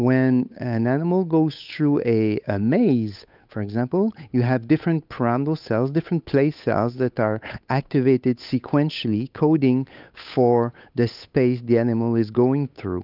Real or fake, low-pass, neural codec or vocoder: fake; 5.4 kHz; codec, 16 kHz, 8 kbps, FunCodec, trained on Chinese and English, 25 frames a second